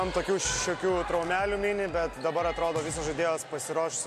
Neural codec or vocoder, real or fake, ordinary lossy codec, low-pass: none; real; MP3, 64 kbps; 14.4 kHz